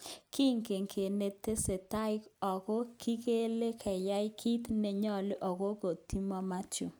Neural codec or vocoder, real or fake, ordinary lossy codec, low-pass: none; real; none; none